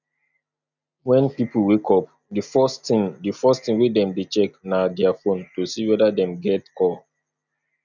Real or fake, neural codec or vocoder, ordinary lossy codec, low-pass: real; none; none; 7.2 kHz